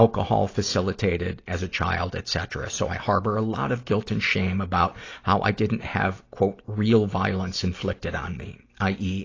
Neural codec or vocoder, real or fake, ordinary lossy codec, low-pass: none; real; AAC, 32 kbps; 7.2 kHz